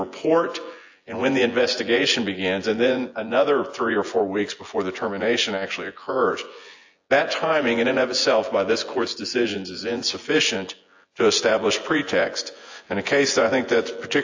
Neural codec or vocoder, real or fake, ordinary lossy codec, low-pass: vocoder, 24 kHz, 100 mel bands, Vocos; fake; AAC, 48 kbps; 7.2 kHz